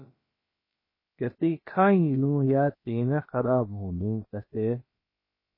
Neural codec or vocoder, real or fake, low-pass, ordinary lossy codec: codec, 16 kHz, about 1 kbps, DyCAST, with the encoder's durations; fake; 5.4 kHz; MP3, 24 kbps